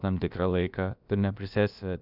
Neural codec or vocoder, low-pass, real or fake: codec, 16 kHz, about 1 kbps, DyCAST, with the encoder's durations; 5.4 kHz; fake